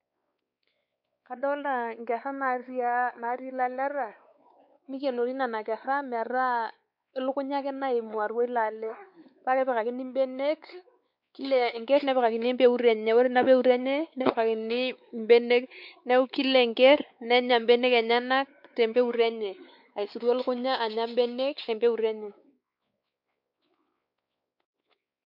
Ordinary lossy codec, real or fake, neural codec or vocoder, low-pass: MP3, 48 kbps; fake; codec, 16 kHz, 4 kbps, X-Codec, WavLM features, trained on Multilingual LibriSpeech; 5.4 kHz